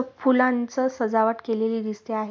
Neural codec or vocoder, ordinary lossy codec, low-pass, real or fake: none; none; none; real